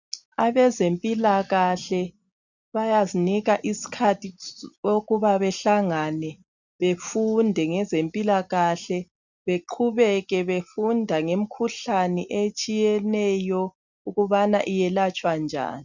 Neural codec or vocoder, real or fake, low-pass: none; real; 7.2 kHz